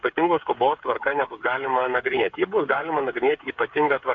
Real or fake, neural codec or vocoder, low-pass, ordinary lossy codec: fake; codec, 16 kHz, 8 kbps, FreqCodec, smaller model; 7.2 kHz; AAC, 48 kbps